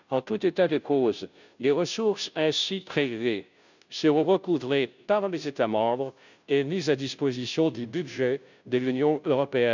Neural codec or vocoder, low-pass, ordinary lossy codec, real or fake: codec, 16 kHz, 0.5 kbps, FunCodec, trained on Chinese and English, 25 frames a second; 7.2 kHz; none; fake